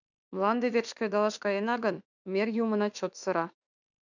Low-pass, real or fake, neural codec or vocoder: 7.2 kHz; fake; autoencoder, 48 kHz, 32 numbers a frame, DAC-VAE, trained on Japanese speech